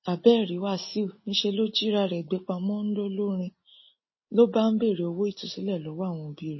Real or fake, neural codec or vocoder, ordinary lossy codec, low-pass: real; none; MP3, 24 kbps; 7.2 kHz